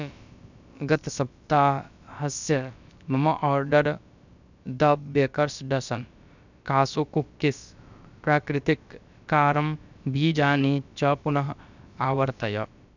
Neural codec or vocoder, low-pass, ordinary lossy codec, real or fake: codec, 16 kHz, about 1 kbps, DyCAST, with the encoder's durations; 7.2 kHz; none; fake